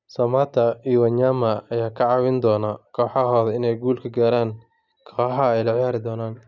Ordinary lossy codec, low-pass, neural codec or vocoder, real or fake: none; 7.2 kHz; none; real